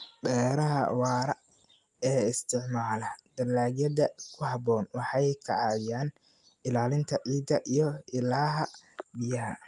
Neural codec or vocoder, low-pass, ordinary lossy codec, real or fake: none; 10.8 kHz; Opus, 32 kbps; real